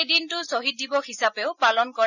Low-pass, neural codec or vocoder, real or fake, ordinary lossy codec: 7.2 kHz; none; real; none